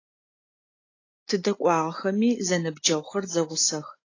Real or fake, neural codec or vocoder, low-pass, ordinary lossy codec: real; none; 7.2 kHz; AAC, 32 kbps